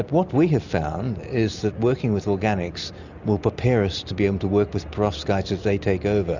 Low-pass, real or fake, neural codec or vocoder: 7.2 kHz; real; none